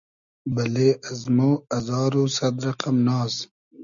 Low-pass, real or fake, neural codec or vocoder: 7.2 kHz; real; none